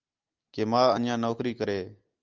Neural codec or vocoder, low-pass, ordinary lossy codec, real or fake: none; 7.2 kHz; Opus, 24 kbps; real